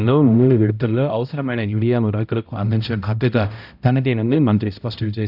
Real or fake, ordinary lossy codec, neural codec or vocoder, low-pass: fake; none; codec, 16 kHz, 0.5 kbps, X-Codec, HuBERT features, trained on balanced general audio; 5.4 kHz